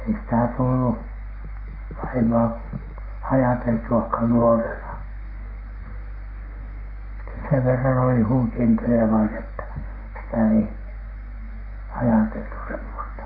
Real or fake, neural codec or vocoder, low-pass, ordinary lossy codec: fake; codec, 44.1 kHz, 7.8 kbps, Pupu-Codec; 5.4 kHz; none